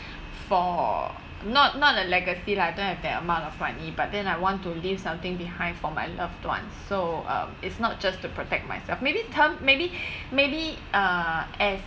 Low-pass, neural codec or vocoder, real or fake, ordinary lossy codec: none; none; real; none